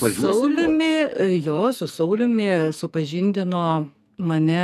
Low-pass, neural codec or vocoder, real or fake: 14.4 kHz; codec, 44.1 kHz, 2.6 kbps, SNAC; fake